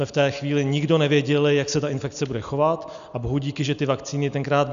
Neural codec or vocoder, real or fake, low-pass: none; real; 7.2 kHz